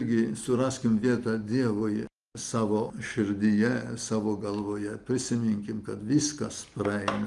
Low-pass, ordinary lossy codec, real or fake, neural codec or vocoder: 10.8 kHz; Opus, 32 kbps; real; none